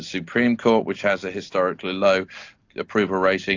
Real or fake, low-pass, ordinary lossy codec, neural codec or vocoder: real; 7.2 kHz; AAC, 48 kbps; none